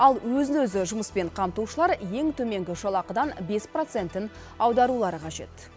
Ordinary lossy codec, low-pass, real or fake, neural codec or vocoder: none; none; real; none